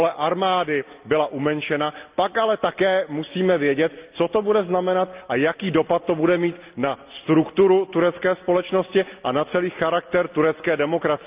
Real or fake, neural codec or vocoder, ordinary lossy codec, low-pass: real; none; Opus, 24 kbps; 3.6 kHz